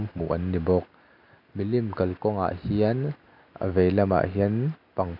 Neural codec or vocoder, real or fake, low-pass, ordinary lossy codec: none; real; 5.4 kHz; none